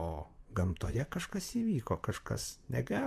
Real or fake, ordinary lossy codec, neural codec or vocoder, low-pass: fake; MP3, 96 kbps; vocoder, 44.1 kHz, 128 mel bands, Pupu-Vocoder; 14.4 kHz